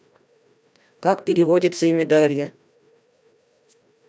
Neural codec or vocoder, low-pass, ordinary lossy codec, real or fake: codec, 16 kHz, 1 kbps, FreqCodec, larger model; none; none; fake